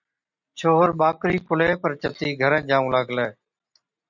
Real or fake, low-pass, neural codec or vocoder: real; 7.2 kHz; none